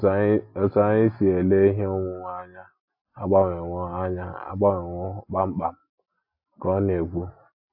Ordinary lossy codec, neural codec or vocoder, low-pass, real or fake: AAC, 48 kbps; none; 5.4 kHz; real